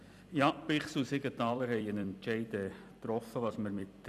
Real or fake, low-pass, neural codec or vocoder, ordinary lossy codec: fake; 14.4 kHz; vocoder, 44.1 kHz, 128 mel bands every 256 samples, BigVGAN v2; none